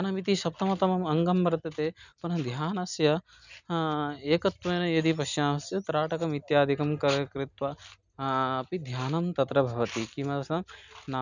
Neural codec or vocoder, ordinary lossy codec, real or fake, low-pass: none; none; real; 7.2 kHz